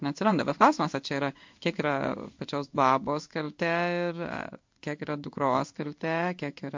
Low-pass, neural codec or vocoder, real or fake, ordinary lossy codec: 7.2 kHz; codec, 16 kHz in and 24 kHz out, 1 kbps, XY-Tokenizer; fake; MP3, 48 kbps